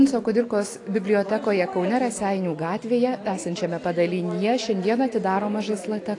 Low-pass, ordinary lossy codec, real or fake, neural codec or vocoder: 10.8 kHz; AAC, 48 kbps; real; none